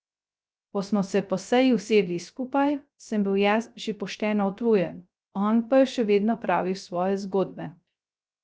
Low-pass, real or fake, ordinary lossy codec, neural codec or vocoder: none; fake; none; codec, 16 kHz, 0.3 kbps, FocalCodec